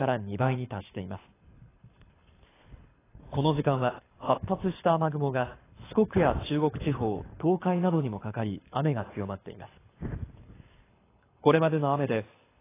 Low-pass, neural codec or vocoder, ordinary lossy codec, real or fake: 3.6 kHz; codec, 24 kHz, 6 kbps, HILCodec; AAC, 16 kbps; fake